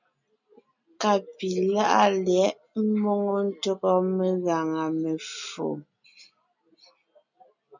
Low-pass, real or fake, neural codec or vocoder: 7.2 kHz; real; none